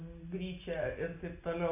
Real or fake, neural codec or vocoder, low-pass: real; none; 3.6 kHz